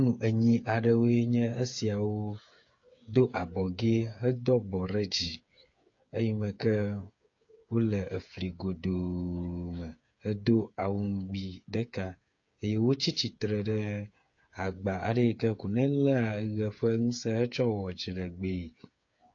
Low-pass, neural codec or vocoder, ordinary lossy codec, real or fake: 7.2 kHz; codec, 16 kHz, 8 kbps, FreqCodec, smaller model; AAC, 48 kbps; fake